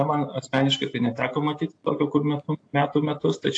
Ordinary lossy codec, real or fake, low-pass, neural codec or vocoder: AAC, 48 kbps; real; 9.9 kHz; none